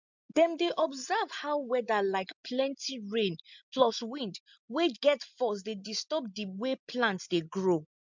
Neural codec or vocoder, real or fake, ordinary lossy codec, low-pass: none; real; MP3, 64 kbps; 7.2 kHz